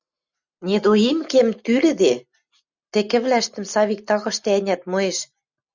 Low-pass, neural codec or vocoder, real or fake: 7.2 kHz; none; real